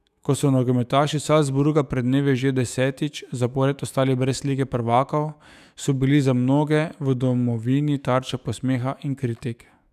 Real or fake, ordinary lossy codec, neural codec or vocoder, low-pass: fake; none; autoencoder, 48 kHz, 128 numbers a frame, DAC-VAE, trained on Japanese speech; 14.4 kHz